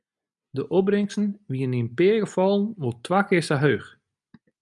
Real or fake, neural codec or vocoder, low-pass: real; none; 10.8 kHz